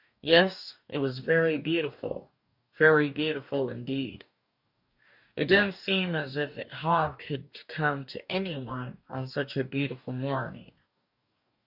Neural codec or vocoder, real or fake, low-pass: codec, 44.1 kHz, 2.6 kbps, DAC; fake; 5.4 kHz